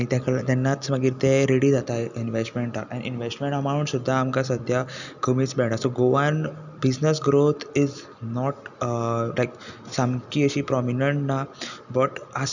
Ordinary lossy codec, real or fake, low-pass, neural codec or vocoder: none; real; 7.2 kHz; none